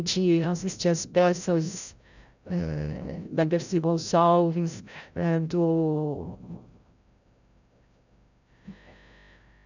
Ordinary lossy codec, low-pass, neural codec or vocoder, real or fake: none; 7.2 kHz; codec, 16 kHz, 0.5 kbps, FreqCodec, larger model; fake